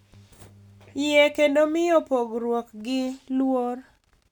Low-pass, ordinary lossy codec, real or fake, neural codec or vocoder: 19.8 kHz; none; real; none